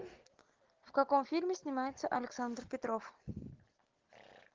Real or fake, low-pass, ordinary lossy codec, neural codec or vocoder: real; 7.2 kHz; Opus, 16 kbps; none